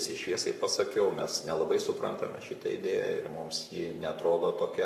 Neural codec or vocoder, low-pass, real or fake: codec, 44.1 kHz, 7.8 kbps, Pupu-Codec; 14.4 kHz; fake